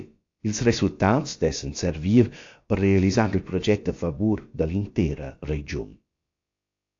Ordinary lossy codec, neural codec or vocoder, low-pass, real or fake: AAC, 48 kbps; codec, 16 kHz, about 1 kbps, DyCAST, with the encoder's durations; 7.2 kHz; fake